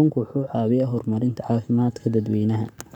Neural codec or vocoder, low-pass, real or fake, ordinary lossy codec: codec, 44.1 kHz, 7.8 kbps, Pupu-Codec; 19.8 kHz; fake; none